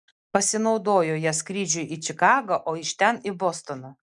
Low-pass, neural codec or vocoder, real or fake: 10.8 kHz; none; real